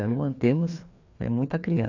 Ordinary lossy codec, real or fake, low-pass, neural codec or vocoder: AAC, 48 kbps; fake; 7.2 kHz; codec, 16 kHz, 2 kbps, FreqCodec, larger model